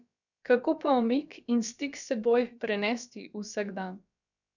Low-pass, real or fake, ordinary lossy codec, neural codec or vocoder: 7.2 kHz; fake; none; codec, 16 kHz, about 1 kbps, DyCAST, with the encoder's durations